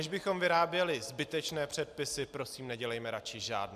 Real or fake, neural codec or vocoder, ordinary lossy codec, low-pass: real; none; AAC, 96 kbps; 14.4 kHz